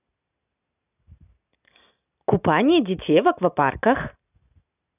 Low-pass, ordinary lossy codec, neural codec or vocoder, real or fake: 3.6 kHz; none; none; real